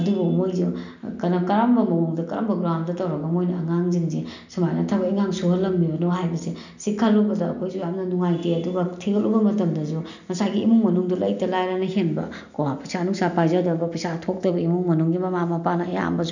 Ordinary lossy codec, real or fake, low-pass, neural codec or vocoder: none; real; 7.2 kHz; none